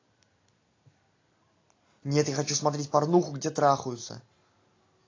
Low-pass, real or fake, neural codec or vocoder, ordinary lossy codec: 7.2 kHz; real; none; AAC, 32 kbps